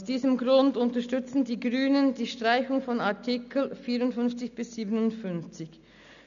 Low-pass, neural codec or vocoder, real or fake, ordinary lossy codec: 7.2 kHz; none; real; none